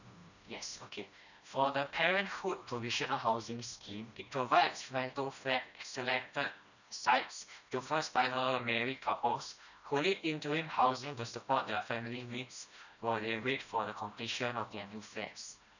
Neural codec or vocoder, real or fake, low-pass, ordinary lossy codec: codec, 16 kHz, 1 kbps, FreqCodec, smaller model; fake; 7.2 kHz; none